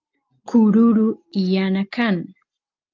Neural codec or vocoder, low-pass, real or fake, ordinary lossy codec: none; 7.2 kHz; real; Opus, 24 kbps